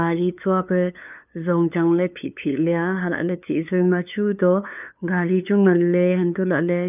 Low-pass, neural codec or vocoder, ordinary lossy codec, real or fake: 3.6 kHz; codec, 16 kHz, 2 kbps, FunCodec, trained on LibriTTS, 25 frames a second; none; fake